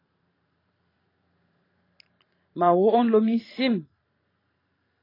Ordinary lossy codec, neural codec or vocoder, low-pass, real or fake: AAC, 24 kbps; vocoder, 44.1 kHz, 128 mel bands every 512 samples, BigVGAN v2; 5.4 kHz; fake